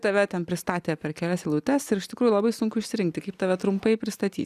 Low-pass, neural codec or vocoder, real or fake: 14.4 kHz; none; real